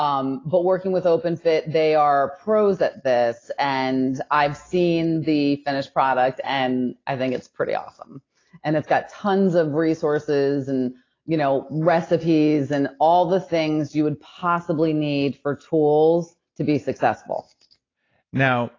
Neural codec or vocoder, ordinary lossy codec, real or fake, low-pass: none; AAC, 32 kbps; real; 7.2 kHz